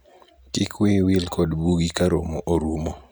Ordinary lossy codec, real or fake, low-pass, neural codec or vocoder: none; real; none; none